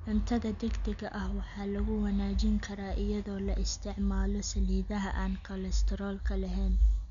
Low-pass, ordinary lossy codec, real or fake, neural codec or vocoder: 7.2 kHz; none; real; none